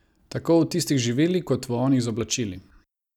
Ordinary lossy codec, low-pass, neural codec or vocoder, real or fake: none; 19.8 kHz; none; real